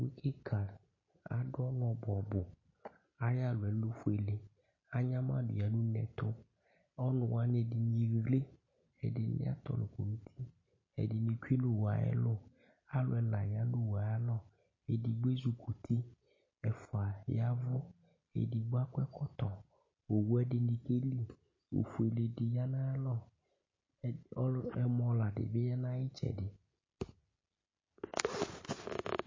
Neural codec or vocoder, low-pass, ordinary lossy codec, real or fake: none; 7.2 kHz; MP3, 32 kbps; real